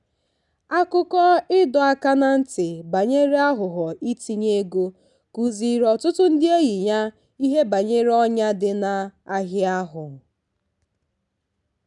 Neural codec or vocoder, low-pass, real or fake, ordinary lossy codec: none; 10.8 kHz; real; none